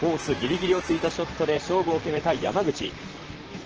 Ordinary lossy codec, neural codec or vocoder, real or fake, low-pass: Opus, 16 kbps; vocoder, 44.1 kHz, 128 mel bands, Pupu-Vocoder; fake; 7.2 kHz